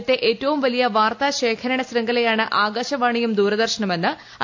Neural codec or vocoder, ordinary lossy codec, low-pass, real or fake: none; AAC, 48 kbps; 7.2 kHz; real